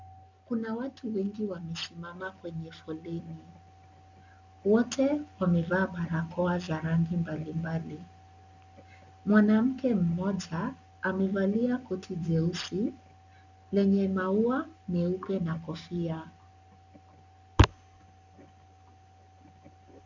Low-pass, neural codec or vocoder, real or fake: 7.2 kHz; none; real